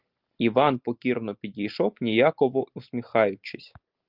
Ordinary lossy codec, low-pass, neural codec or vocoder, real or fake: Opus, 24 kbps; 5.4 kHz; none; real